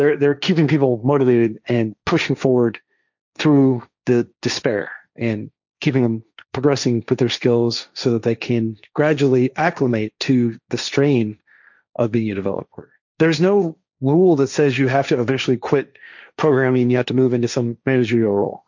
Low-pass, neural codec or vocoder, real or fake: 7.2 kHz; codec, 16 kHz, 1.1 kbps, Voila-Tokenizer; fake